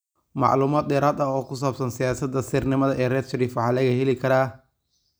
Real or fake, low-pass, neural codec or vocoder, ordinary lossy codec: real; none; none; none